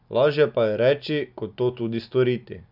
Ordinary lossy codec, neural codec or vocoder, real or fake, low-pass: none; none; real; 5.4 kHz